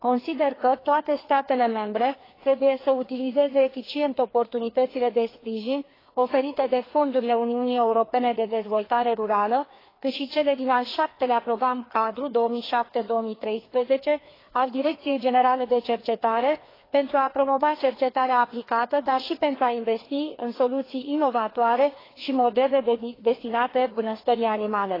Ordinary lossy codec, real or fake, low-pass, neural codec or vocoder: AAC, 24 kbps; fake; 5.4 kHz; codec, 16 kHz, 2 kbps, FreqCodec, larger model